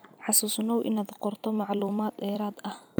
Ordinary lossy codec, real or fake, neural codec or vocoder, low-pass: none; fake; vocoder, 44.1 kHz, 128 mel bands every 256 samples, BigVGAN v2; none